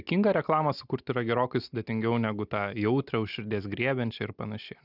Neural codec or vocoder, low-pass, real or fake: none; 5.4 kHz; real